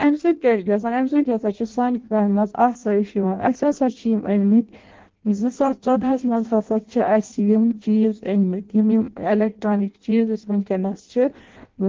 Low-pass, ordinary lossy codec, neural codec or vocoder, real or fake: 7.2 kHz; Opus, 16 kbps; codec, 16 kHz in and 24 kHz out, 0.6 kbps, FireRedTTS-2 codec; fake